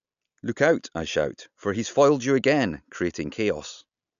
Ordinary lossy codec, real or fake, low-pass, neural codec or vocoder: none; real; 7.2 kHz; none